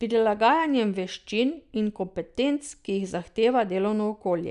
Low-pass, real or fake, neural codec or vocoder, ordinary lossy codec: 10.8 kHz; real; none; none